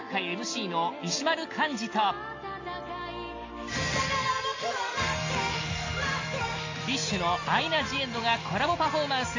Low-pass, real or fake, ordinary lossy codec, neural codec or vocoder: 7.2 kHz; real; AAC, 32 kbps; none